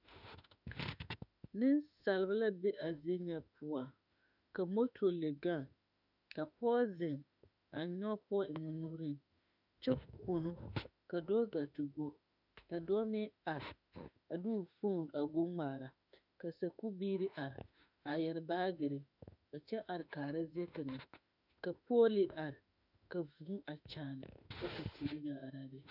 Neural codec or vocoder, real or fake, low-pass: autoencoder, 48 kHz, 32 numbers a frame, DAC-VAE, trained on Japanese speech; fake; 5.4 kHz